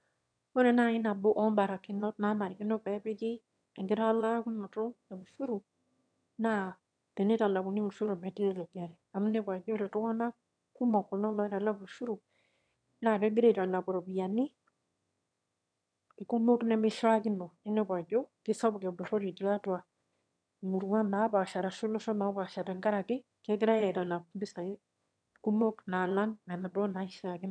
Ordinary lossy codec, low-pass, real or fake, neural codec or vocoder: none; 9.9 kHz; fake; autoencoder, 22.05 kHz, a latent of 192 numbers a frame, VITS, trained on one speaker